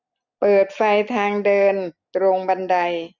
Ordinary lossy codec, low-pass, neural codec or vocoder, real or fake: none; 7.2 kHz; none; real